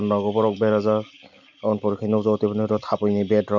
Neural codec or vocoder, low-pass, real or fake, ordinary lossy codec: none; 7.2 kHz; real; none